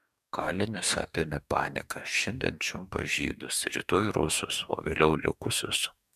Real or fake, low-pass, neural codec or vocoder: fake; 14.4 kHz; codec, 44.1 kHz, 2.6 kbps, DAC